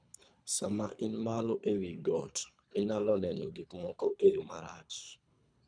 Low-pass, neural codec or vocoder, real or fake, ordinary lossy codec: 9.9 kHz; codec, 24 kHz, 3 kbps, HILCodec; fake; none